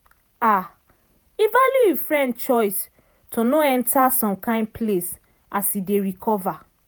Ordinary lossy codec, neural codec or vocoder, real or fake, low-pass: none; vocoder, 48 kHz, 128 mel bands, Vocos; fake; none